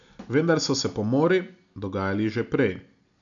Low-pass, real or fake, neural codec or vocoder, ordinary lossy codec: 7.2 kHz; real; none; none